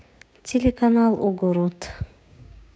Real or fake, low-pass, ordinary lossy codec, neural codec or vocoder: fake; none; none; codec, 16 kHz, 6 kbps, DAC